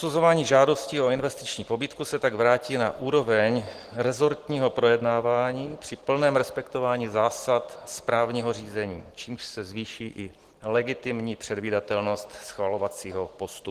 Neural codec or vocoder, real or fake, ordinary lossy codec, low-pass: none; real; Opus, 16 kbps; 14.4 kHz